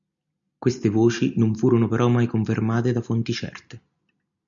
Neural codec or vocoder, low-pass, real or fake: none; 7.2 kHz; real